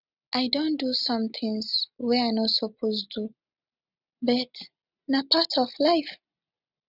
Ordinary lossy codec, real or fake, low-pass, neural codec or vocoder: none; real; 5.4 kHz; none